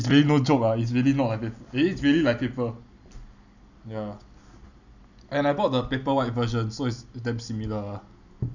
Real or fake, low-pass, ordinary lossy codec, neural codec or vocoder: real; 7.2 kHz; none; none